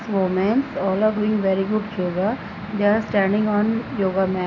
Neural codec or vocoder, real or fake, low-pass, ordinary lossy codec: none; real; 7.2 kHz; AAC, 32 kbps